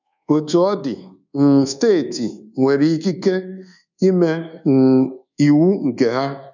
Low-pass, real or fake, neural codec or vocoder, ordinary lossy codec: 7.2 kHz; fake; codec, 24 kHz, 1.2 kbps, DualCodec; none